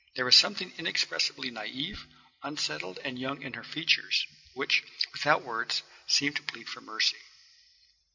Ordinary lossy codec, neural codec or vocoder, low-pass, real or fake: MP3, 64 kbps; none; 7.2 kHz; real